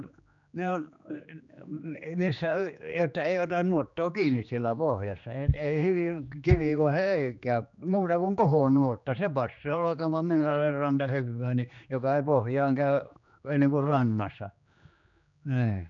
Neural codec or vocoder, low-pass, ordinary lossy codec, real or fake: codec, 16 kHz, 2 kbps, X-Codec, HuBERT features, trained on general audio; 7.2 kHz; none; fake